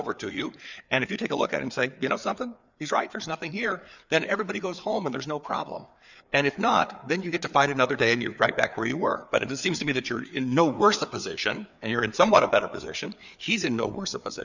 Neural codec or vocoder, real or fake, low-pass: codec, 16 kHz, 4 kbps, FreqCodec, larger model; fake; 7.2 kHz